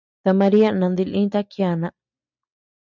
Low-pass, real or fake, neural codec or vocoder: 7.2 kHz; real; none